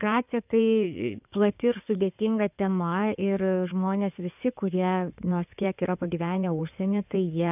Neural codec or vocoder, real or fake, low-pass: codec, 16 kHz in and 24 kHz out, 2.2 kbps, FireRedTTS-2 codec; fake; 3.6 kHz